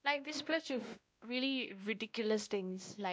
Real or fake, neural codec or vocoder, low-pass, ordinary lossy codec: fake; codec, 16 kHz, 1 kbps, X-Codec, WavLM features, trained on Multilingual LibriSpeech; none; none